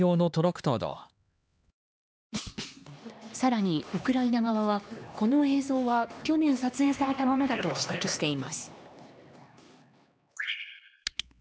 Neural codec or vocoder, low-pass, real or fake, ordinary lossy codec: codec, 16 kHz, 2 kbps, X-Codec, HuBERT features, trained on LibriSpeech; none; fake; none